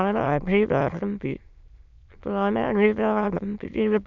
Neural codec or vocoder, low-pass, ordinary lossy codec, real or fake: autoencoder, 22.05 kHz, a latent of 192 numbers a frame, VITS, trained on many speakers; 7.2 kHz; none; fake